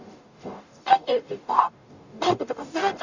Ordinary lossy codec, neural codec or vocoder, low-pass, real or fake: none; codec, 44.1 kHz, 0.9 kbps, DAC; 7.2 kHz; fake